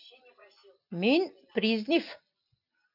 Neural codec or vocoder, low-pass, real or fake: none; 5.4 kHz; real